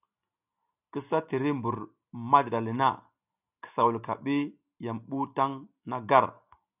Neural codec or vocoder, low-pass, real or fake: none; 3.6 kHz; real